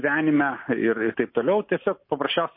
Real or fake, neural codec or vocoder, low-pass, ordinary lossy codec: real; none; 5.4 kHz; MP3, 24 kbps